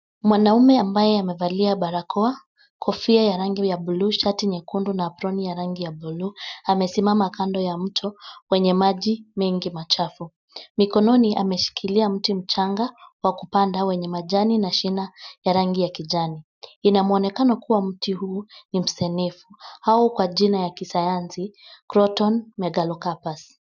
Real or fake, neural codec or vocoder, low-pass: real; none; 7.2 kHz